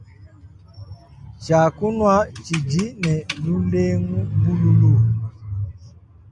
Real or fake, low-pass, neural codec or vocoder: real; 10.8 kHz; none